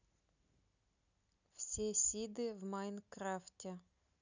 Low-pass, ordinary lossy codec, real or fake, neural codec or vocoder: 7.2 kHz; none; real; none